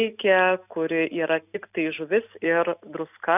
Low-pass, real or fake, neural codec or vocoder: 3.6 kHz; real; none